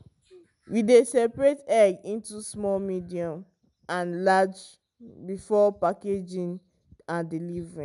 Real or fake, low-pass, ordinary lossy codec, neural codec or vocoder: real; 10.8 kHz; none; none